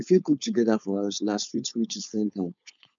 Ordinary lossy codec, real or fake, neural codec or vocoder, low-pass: none; fake; codec, 16 kHz, 4.8 kbps, FACodec; 7.2 kHz